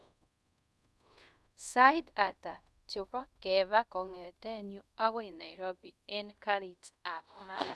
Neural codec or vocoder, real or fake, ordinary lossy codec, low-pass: codec, 24 kHz, 0.5 kbps, DualCodec; fake; none; none